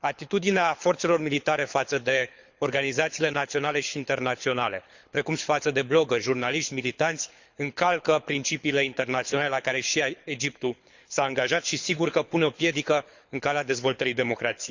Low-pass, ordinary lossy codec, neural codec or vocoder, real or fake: 7.2 kHz; Opus, 64 kbps; codec, 24 kHz, 6 kbps, HILCodec; fake